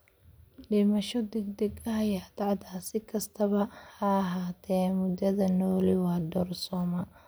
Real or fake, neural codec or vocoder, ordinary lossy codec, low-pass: real; none; none; none